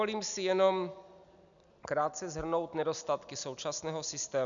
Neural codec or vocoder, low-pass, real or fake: none; 7.2 kHz; real